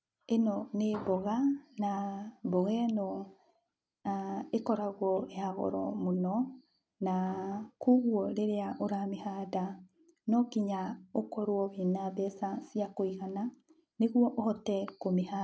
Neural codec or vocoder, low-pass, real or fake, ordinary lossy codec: none; none; real; none